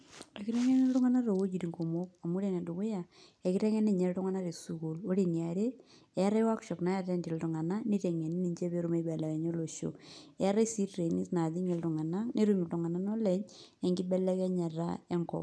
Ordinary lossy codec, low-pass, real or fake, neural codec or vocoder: none; none; real; none